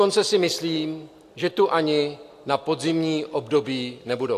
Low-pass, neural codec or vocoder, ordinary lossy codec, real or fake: 14.4 kHz; none; AAC, 64 kbps; real